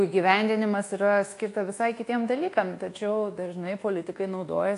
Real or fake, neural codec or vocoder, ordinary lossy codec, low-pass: fake; codec, 24 kHz, 1.2 kbps, DualCodec; AAC, 48 kbps; 10.8 kHz